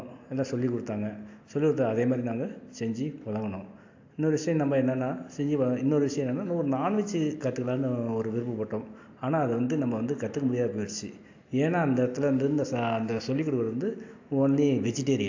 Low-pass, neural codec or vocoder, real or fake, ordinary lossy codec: 7.2 kHz; none; real; AAC, 48 kbps